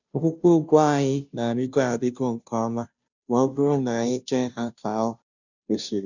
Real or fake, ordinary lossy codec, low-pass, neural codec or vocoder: fake; none; 7.2 kHz; codec, 16 kHz, 0.5 kbps, FunCodec, trained on Chinese and English, 25 frames a second